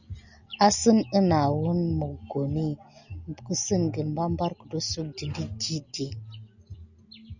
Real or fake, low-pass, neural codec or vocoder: real; 7.2 kHz; none